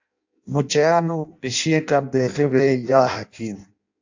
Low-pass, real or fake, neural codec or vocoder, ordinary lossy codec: 7.2 kHz; fake; codec, 16 kHz in and 24 kHz out, 0.6 kbps, FireRedTTS-2 codec; AAC, 48 kbps